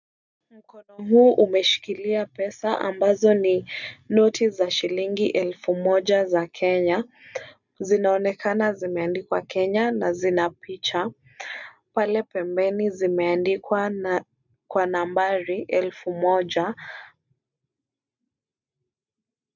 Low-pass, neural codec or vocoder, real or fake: 7.2 kHz; none; real